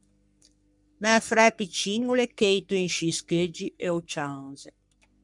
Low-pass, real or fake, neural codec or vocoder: 10.8 kHz; fake; codec, 44.1 kHz, 3.4 kbps, Pupu-Codec